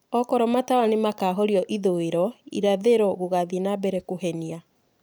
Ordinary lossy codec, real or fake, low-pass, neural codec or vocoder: none; real; none; none